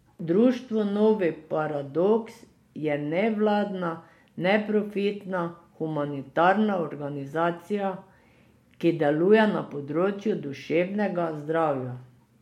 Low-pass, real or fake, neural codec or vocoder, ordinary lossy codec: 19.8 kHz; real; none; MP3, 64 kbps